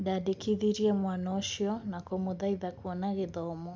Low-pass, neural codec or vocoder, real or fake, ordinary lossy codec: none; none; real; none